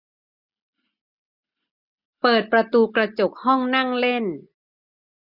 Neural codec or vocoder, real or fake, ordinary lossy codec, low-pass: none; real; none; 5.4 kHz